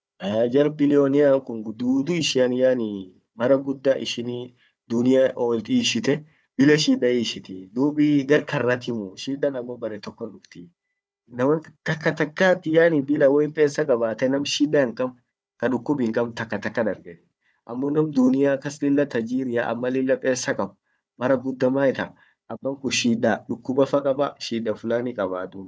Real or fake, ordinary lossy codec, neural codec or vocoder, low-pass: fake; none; codec, 16 kHz, 4 kbps, FunCodec, trained on Chinese and English, 50 frames a second; none